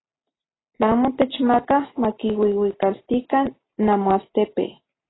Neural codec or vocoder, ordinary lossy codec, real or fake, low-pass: none; AAC, 16 kbps; real; 7.2 kHz